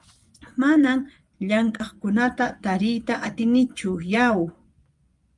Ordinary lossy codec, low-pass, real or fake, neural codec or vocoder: Opus, 32 kbps; 10.8 kHz; fake; vocoder, 24 kHz, 100 mel bands, Vocos